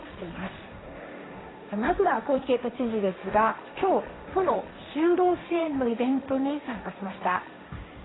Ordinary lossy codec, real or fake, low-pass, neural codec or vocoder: AAC, 16 kbps; fake; 7.2 kHz; codec, 16 kHz, 1.1 kbps, Voila-Tokenizer